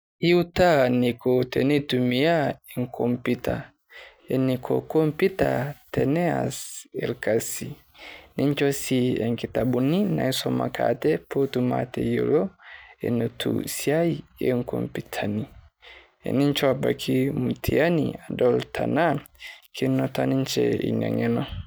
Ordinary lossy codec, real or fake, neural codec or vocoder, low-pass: none; real; none; none